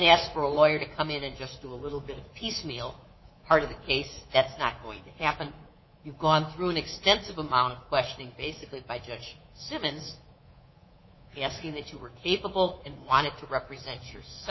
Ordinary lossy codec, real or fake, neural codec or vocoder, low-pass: MP3, 24 kbps; fake; codec, 24 kHz, 3.1 kbps, DualCodec; 7.2 kHz